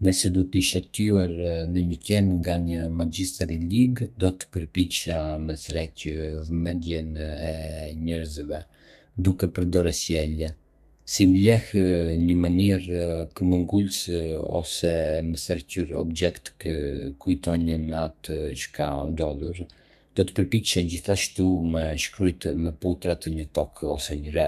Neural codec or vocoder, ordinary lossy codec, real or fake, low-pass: codec, 32 kHz, 1.9 kbps, SNAC; none; fake; 14.4 kHz